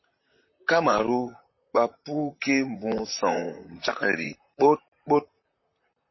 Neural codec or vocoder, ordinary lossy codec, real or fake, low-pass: vocoder, 44.1 kHz, 128 mel bands, Pupu-Vocoder; MP3, 24 kbps; fake; 7.2 kHz